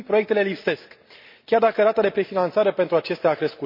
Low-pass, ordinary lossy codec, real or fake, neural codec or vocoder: 5.4 kHz; MP3, 32 kbps; real; none